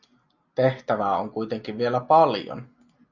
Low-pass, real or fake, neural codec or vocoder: 7.2 kHz; real; none